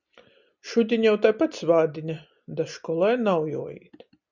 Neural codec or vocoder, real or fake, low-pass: none; real; 7.2 kHz